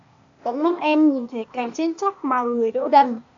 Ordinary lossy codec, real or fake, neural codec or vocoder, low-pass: AAC, 64 kbps; fake; codec, 16 kHz, 0.8 kbps, ZipCodec; 7.2 kHz